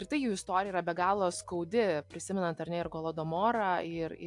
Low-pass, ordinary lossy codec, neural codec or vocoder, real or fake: 10.8 kHz; AAC, 64 kbps; none; real